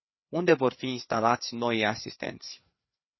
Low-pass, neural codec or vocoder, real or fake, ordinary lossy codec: 7.2 kHz; codec, 16 kHz, 4 kbps, FreqCodec, larger model; fake; MP3, 24 kbps